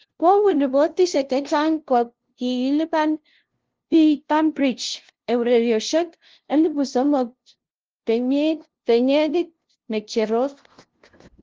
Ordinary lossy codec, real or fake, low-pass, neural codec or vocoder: Opus, 16 kbps; fake; 7.2 kHz; codec, 16 kHz, 0.5 kbps, FunCodec, trained on LibriTTS, 25 frames a second